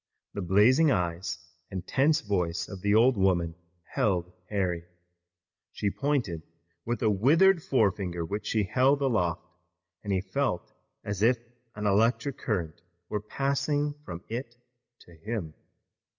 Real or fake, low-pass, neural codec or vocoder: real; 7.2 kHz; none